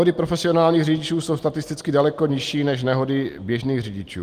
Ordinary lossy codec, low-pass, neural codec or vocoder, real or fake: Opus, 24 kbps; 14.4 kHz; none; real